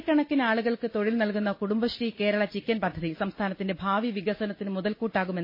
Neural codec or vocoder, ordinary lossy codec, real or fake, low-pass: none; MP3, 24 kbps; real; 5.4 kHz